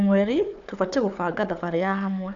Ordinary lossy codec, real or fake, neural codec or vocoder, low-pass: none; fake; codec, 16 kHz, 4 kbps, FunCodec, trained on Chinese and English, 50 frames a second; 7.2 kHz